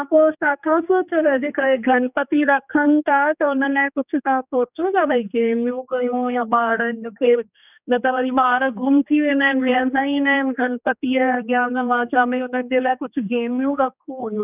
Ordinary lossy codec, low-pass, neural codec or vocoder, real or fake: none; 3.6 kHz; codec, 16 kHz, 2 kbps, X-Codec, HuBERT features, trained on general audio; fake